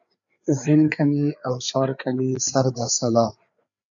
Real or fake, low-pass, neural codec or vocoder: fake; 7.2 kHz; codec, 16 kHz, 4 kbps, FreqCodec, larger model